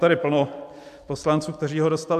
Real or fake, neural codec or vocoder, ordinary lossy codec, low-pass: real; none; AAC, 96 kbps; 14.4 kHz